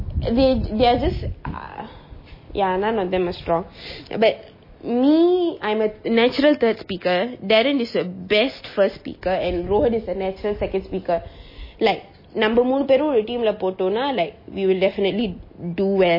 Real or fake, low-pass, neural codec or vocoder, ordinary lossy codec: real; 5.4 kHz; none; MP3, 24 kbps